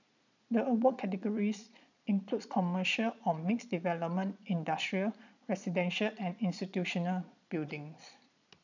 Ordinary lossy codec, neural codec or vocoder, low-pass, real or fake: none; vocoder, 22.05 kHz, 80 mel bands, Vocos; 7.2 kHz; fake